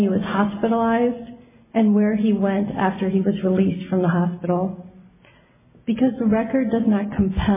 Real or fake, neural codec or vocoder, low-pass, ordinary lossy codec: real; none; 3.6 kHz; MP3, 16 kbps